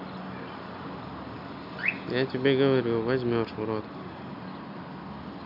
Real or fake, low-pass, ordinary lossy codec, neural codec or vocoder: real; 5.4 kHz; MP3, 48 kbps; none